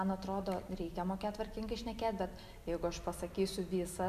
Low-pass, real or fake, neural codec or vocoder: 14.4 kHz; real; none